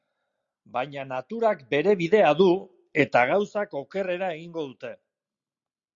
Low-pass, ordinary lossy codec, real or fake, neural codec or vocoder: 7.2 kHz; Opus, 64 kbps; real; none